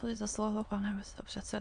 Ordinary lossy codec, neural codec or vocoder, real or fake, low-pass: MP3, 64 kbps; autoencoder, 22.05 kHz, a latent of 192 numbers a frame, VITS, trained on many speakers; fake; 9.9 kHz